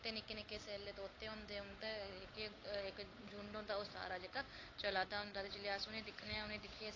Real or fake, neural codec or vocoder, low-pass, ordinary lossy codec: fake; codec, 16 kHz, 8 kbps, FunCodec, trained on Chinese and English, 25 frames a second; 7.2 kHz; AAC, 32 kbps